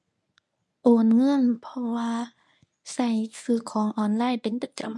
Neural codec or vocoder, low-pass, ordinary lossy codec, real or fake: codec, 24 kHz, 0.9 kbps, WavTokenizer, medium speech release version 1; none; none; fake